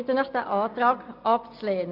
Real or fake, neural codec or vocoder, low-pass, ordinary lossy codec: real; none; 5.4 kHz; MP3, 48 kbps